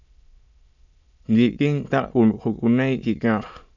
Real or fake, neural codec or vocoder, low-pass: fake; autoencoder, 22.05 kHz, a latent of 192 numbers a frame, VITS, trained on many speakers; 7.2 kHz